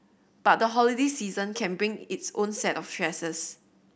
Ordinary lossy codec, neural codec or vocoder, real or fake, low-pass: none; none; real; none